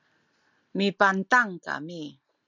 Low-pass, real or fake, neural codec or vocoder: 7.2 kHz; real; none